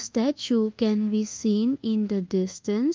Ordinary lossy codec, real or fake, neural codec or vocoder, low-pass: Opus, 24 kbps; fake; codec, 24 kHz, 1.2 kbps, DualCodec; 7.2 kHz